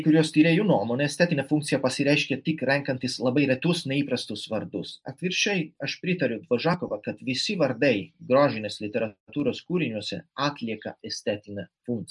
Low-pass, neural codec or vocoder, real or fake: 10.8 kHz; none; real